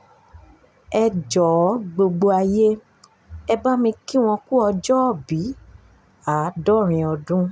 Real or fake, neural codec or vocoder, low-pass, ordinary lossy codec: real; none; none; none